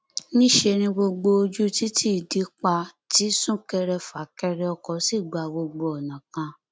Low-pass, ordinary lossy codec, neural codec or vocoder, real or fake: none; none; none; real